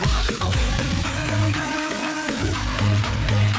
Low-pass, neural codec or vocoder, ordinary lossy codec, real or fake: none; codec, 16 kHz, 4 kbps, FreqCodec, larger model; none; fake